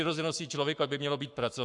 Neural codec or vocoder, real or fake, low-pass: codec, 44.1 kHz, 7.8 kbps, Pupu-Codec; fake; 10.8 kHz